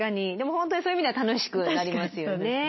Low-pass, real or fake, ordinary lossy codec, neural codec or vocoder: 7.2 kHz; real; MP3, 24 kbps; none